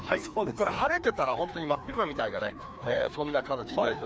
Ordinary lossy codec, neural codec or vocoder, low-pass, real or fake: none; codec, 16 kHz, 2 kbps, FreqCodec, larger model; none; fake